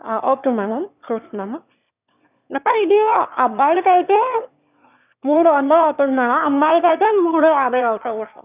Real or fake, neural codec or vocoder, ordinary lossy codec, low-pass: fake; autoencoder, 22.05 kHz, a latent of 192 numbers a frame, VITS, trained on one speaker; none; 3.6 kHz